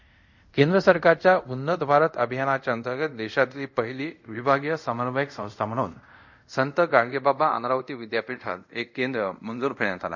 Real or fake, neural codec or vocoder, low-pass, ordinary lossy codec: fake; codec, 24 kHz, 0.5 kbps, DualCodec; 7.2 kHz; none